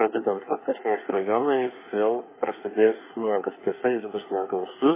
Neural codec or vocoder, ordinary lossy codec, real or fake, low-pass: codec, 24 kHz, 1 kbps, SNAC; MP3, 16 kbps; fake; 3.6 kHz